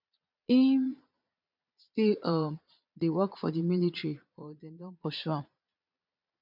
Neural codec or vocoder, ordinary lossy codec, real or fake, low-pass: vocoder, 22.05 kHz, 80 mel bands, Vocos; none; fake; 5.4 kHz